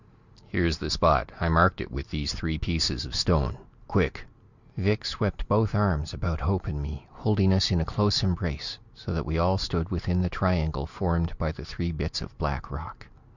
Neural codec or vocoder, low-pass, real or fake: none; 7.2 kHz; real